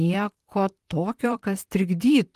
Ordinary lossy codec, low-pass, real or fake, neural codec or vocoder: Opus, 24 kbps; 14.4 kHz; fake; vocoder, 44.1 kHz, 128 mel bands, Pupu-Vocoder